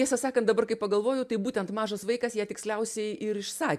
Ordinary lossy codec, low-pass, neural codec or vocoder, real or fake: MP3, 96 kbps; 14.4 kHz; none; real